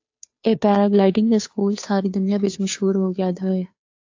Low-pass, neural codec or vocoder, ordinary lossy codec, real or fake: 7.2 kHz; codec, 16 kHz, 2 kbps, FunCodec, trained on Chinese and English, 25 frames a second; AAC, 48 kbps; fake